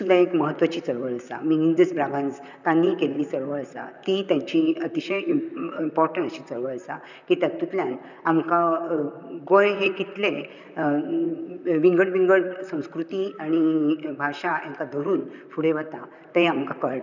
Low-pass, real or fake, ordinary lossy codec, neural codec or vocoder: 7.2 kHz; fake; none; vocoder, 44.1 kHz, 128 mel bands, Pupu-Vocoder